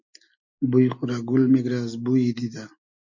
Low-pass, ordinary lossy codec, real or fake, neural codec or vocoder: 7.2 kHz; MP3, 48 kbps; real; none